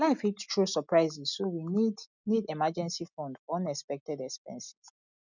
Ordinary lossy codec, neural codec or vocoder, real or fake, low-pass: none; none; real; 7.2 kHz